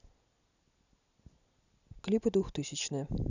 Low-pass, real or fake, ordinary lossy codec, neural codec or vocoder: 7.2 kHz; real; none; none